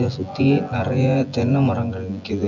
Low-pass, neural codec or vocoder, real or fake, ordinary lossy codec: 7.2 kHz; vocoder, 24 kHz, 100 mel bands, Vocos; fake; none